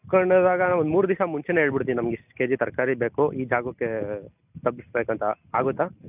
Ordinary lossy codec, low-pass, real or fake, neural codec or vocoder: none; 3.6 kHz; real; none